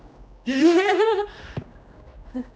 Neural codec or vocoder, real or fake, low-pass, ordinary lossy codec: codec, 16 kHz, 1 kbps, X-Codec, HuBERT features, trained on general audio; fake; none; none